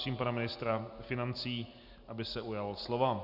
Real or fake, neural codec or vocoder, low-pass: real; none; 5.4 kHz